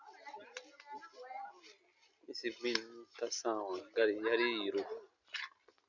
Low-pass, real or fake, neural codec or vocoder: 7.2 kHz; real; none